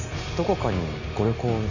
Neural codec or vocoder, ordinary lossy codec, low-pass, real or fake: none; none; 7.2 kHz; real